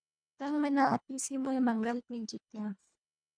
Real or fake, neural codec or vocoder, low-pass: fake; codec, 24 kHz, 1.5 kbps, HILCodec; 9.9 kHz